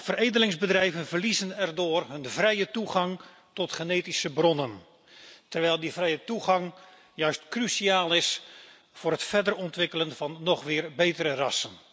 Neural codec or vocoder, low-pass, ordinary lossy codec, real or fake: none; none; none; real